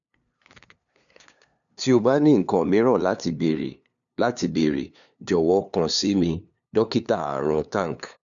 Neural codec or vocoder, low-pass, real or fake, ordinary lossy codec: codec, 16 kHz, 2 kbps, FunCodec, trained on LibriTTS, 25 frames a second; 7.2 kHz; fake; none